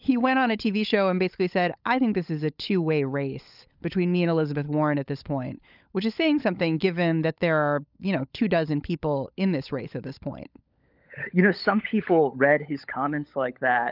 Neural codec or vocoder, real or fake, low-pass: codec, 16 kHz, 16 kbps, FunCodec, trained on LibriTTS, 50 frames a second; fake; 5.4 kHz